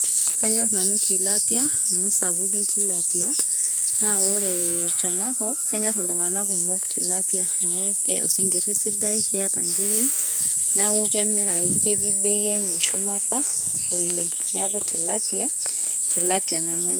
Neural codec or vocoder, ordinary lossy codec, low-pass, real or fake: codec, 44.1 kHz, 2.6 kbps, SNAC; none; none; fake